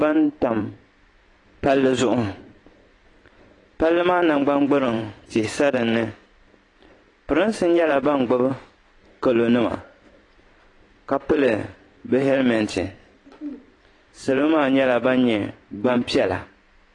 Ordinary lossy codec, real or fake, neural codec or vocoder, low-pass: AAC, 32 kbps; fake; vocoder, 44.1 kHz, 128 mel bands every 512 samples, BigVGAN v2; 10.8 kHz